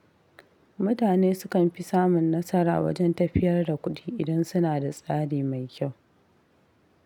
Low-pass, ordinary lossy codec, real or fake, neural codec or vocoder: 19.8 kHz; none; real; none